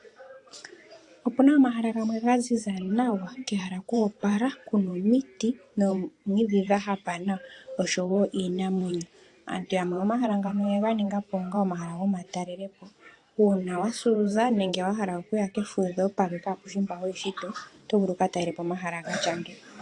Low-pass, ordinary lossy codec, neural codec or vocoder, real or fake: 10.8 kHz; AAC, 48 kbps; vocoder, 44.1 kHz, 128 mel bands every 512 samples, BigVGAN v2; fake